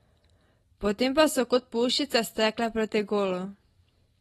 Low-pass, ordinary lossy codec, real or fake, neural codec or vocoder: 19.8 kHz; AAC, 32 kbps; real; none